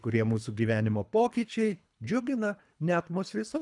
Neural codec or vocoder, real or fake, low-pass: codec, 24 kHz, 3 kbps, HILCodec; fake; 10.8 kHz